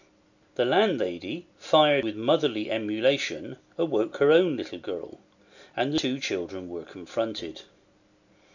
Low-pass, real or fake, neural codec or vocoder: 7.2 kHz; real; none